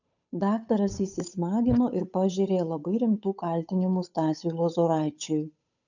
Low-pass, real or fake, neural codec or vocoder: 7.2 kHz; fake; codec, 16 kHz, 8 kbps, FunCodec, trained on Chinese and English, 25 frames a second